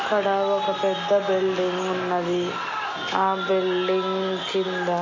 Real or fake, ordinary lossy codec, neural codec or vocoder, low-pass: real; MP3, 32 kbps; none; 7.2 kHz